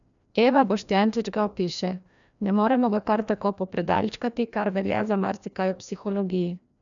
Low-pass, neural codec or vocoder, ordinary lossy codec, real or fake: 7.2 kHz; codec, 16 kHz, 1 kbps, FreqCodec, larger model; none; fake